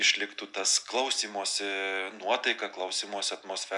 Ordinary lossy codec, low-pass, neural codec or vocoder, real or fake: MP3, 96 kbps; 10.8 kHz; none; real